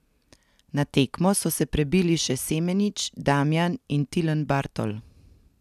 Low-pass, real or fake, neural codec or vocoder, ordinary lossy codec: 14.4 kHz; real; none; none